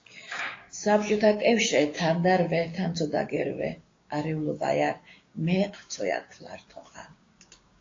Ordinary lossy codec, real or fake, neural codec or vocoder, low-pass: AAC, 32 kbps; fake; codec, 16 kHz, 6 kbps, DAC; 7.2 kHz